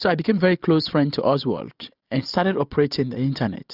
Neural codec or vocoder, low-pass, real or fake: none; 5.4 kHz; real